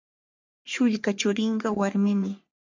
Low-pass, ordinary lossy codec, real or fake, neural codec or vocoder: 7.2 kHz; MP3, 64 kbps; fake; codec, 44.1 kHz, 3.4 kbps, Pupu-Codec